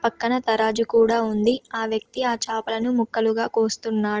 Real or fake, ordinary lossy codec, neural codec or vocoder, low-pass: real; Opus, 16 kbps; none; 7.2 kHz